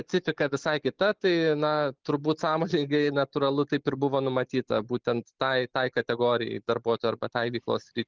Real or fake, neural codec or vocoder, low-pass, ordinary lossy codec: real; none; 7.2 kHz; Opus, 32 kbps